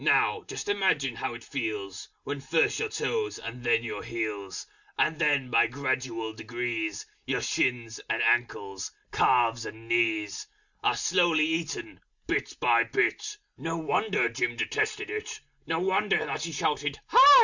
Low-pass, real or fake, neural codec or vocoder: 7.2 kHz; real; none